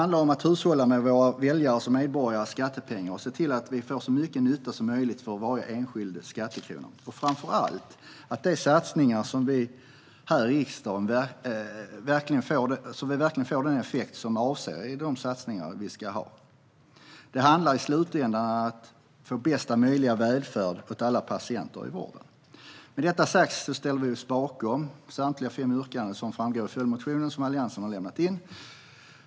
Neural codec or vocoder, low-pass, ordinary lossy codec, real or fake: none; none; none; real